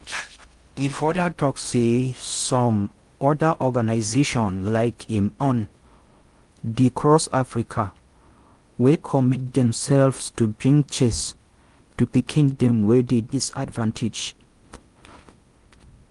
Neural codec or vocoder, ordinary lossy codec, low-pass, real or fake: codec, 16 kHz in and 24 kHz out, 0.6 kbps, FocalCodec, streaming, 4096 codes; Opus, 32 kbps; 10.8 kHz; fake